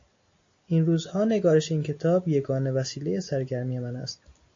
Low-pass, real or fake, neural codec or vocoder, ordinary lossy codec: 7.2 kHz; real; none; AAC, 48 kbps